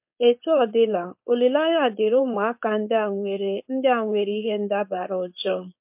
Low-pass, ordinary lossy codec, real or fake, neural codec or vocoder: 3.6 kHz; MP3, 32 kbps; fake; codec, 16 kHz, 4.8 kbps, FACodec